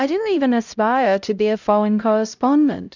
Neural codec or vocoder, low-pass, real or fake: codec, 16 kHz, 0.5 kbps, X-Codec, HuBERT features, trained on LibriSpeech; 7.2 kHz; fake